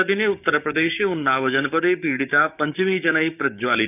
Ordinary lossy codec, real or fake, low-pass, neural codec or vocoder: none; fake; 3.6 kHz; codec, 16 kHz, 6 kbps, DAC